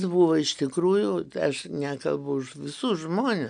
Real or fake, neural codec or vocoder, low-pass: real; none; 9.9 kHz